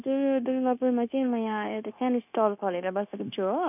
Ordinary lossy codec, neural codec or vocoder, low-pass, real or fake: none; codec, 16 kHz, 0.9 kbps, LongCat-Audio-Codec; 3.6 kHz; fake